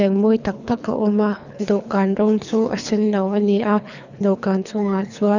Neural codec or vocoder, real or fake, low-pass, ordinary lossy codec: codec, 24 kHz, 3 kbps, HILCodec; fake; 7.2 kHz; none